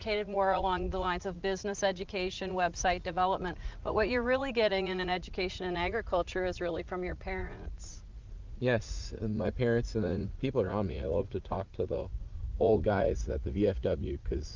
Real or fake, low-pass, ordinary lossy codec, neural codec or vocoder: fake; 7.2 kHz; Opus, 24 kbps; vocoder, 44.1 kHz, 80 mel bands, Vocos